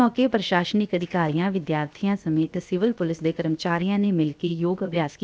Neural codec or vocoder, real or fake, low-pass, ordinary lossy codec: codec, 16 kHz, about 1 kbps, DyCAST, with the encoder's durations; fake; none; none